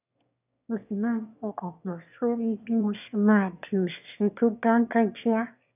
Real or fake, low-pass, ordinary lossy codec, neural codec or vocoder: fake; 3.6 kHz; none; autoencoder, 22.05 kHz, a latent of 192 numbers a frame, VITS, trained on one speaker